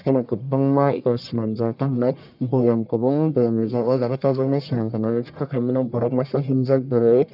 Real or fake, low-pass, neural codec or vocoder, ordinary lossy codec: fake; 5.4 kHz; codec, 44.1 kHz, 1.7 kbps, Pupu-Codec; none